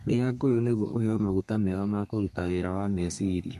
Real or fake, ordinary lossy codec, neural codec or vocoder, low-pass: fake; MP3, 64 kbps; codec, 32 kHz, 1.9 kbps, SNAC; 14.4 kHz